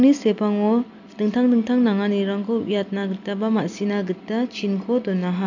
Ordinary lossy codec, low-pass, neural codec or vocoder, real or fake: none; 7.2 kHz; none; real